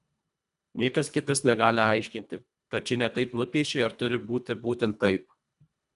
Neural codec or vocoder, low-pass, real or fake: codec, 24 kHz, 1.5 kbps, HILCodec; 10.8 kHz; fake